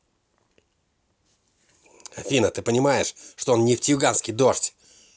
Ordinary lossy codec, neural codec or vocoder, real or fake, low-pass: none; none; real; none